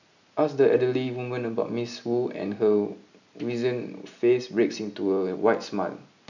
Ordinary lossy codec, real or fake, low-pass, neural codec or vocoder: none; real; 7.2 kHz; none